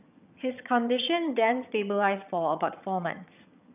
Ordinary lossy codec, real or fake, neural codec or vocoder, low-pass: none; fake; vocoder, 22.05 kHz, 80 mel bands, HiFi-GAN; 3.6 kHz